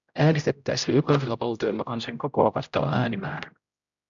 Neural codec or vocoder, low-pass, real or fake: codec, 16 kHz, 0.5 kbps, X-Codec, HuBERT features, trained on general audio; 7.2 kHz; fake